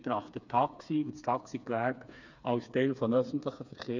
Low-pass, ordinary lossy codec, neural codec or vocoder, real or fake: 7.2 kHz; none; codec, 16 kHz, 4 kbps, FreqCodec, smaller model; fake